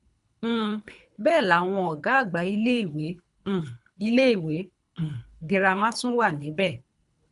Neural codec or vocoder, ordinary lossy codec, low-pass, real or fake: codec, 24 kHz, 3 kbps, HILCodec; none; 10.8 kHz; fake